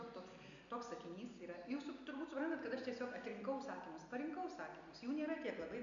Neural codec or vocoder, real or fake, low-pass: none; real; 7.2 kHz